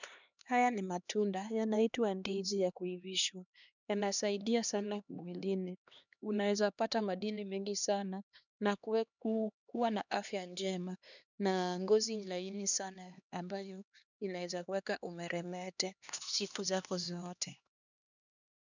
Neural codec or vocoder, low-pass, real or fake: codec, 16 kHz, 2 kbps, X-Codec, HuBERT features, trained on LibriSpeech; 7.2 kHz; fake